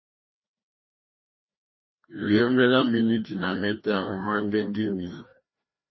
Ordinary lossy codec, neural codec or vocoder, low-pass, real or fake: MP3, 24 kbps; codec, 16 kHz, 1 kbps, FreqCodec, larger model; 7.2 kHz; fake